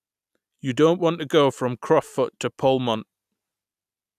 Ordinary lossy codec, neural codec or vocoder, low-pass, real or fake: none; none; 14.4 kHz; real